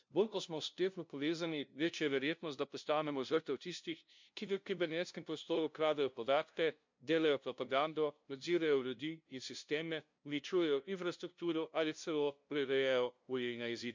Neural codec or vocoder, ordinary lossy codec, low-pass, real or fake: codec, 16 kHz, 0.5 kbps, FunCodec, trained on LibriTTS, 25 frames a second; MP3, 64 kbps; 7.2 kHz; fake